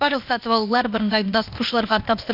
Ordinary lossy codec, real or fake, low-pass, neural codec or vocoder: none; fake; 5.4 kHz; codec, 16 kHz, 0.8 kbps, ZipCodec